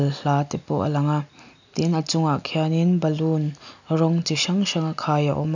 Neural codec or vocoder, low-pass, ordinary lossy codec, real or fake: none; 7.2 kHz; none; real